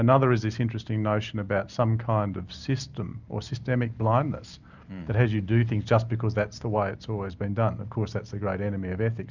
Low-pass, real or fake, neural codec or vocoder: 7.2 kHz; real; none